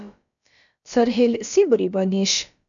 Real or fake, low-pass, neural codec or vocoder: fake; 7.2 kHz; codec, 16 kHz, about 1 kbps, DyCAST, with the encoder's durations